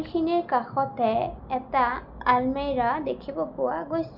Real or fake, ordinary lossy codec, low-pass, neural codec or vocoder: real; none; 5.4 kHz; none